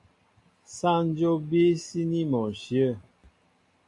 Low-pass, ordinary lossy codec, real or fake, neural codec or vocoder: 10.8 kHz; AAC, 64 kbps; real; none